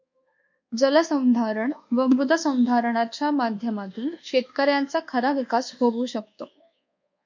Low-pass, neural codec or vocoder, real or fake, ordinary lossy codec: 7.2 kHz; codec, 24 kHz, 1.2 kbps, DualCodec; fake; MP3, 64 kbps